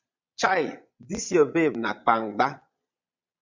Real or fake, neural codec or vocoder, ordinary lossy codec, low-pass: fake; vocoder, 22.05 kHz, 80 mel bands, Vocos; MP3, 64 kbps; 7.2 kHz